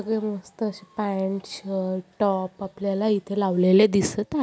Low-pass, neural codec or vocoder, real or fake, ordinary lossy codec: none; none; real; none